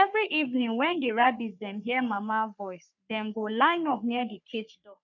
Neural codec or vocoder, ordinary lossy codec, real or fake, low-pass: codec, 44.1 kHz, 3.4 kbps, Pupu-Codec; none; fake; 7.2 kHz